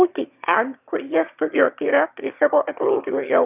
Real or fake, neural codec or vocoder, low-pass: fake; autoencoder, 22.05 kHz, a latent of 192 numbers a frame, VITS, trained on one speaker; 3.6 kHz